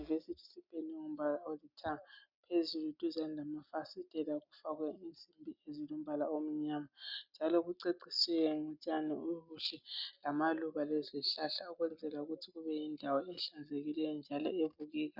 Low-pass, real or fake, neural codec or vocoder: 5.4 kHz; real; none